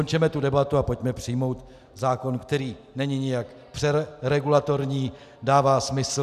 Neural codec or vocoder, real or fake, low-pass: none; real; 14.4 kHz